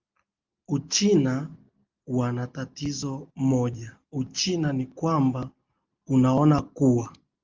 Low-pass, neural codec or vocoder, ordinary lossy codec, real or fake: 7.2 kHz; none; Opus, 32 kbps; real